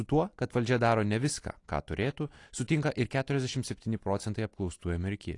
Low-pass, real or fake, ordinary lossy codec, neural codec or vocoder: 10.8 kHz; real; AAC, 48 kbps; none